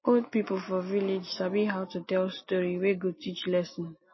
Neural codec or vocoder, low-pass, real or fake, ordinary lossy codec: none; 7.2 kHz; real; MP3, 24 kbps